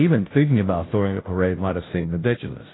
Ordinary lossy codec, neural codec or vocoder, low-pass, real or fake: AAC, 16 kbps; codec, 16 kHz, 0.5 kbps, FunCodec, trained on Chinese and English, 25 frames a second; 7.2 kHz; fake